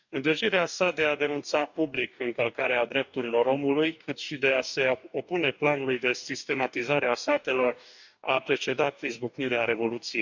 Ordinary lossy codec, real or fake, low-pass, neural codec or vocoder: none; fake; 7.2 kHz; codec, 44.1 kHz, 2.6 kbps, DAC